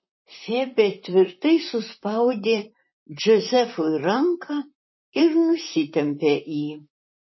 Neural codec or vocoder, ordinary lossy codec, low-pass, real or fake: vocoder, 44.1 kHz, 128 mel bands, Pupu-Vocoder; MP3, 24 kbps; 7.2 kHz; fake